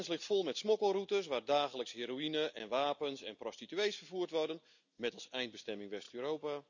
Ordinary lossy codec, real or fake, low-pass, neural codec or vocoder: none; real; 7.2 kHz; none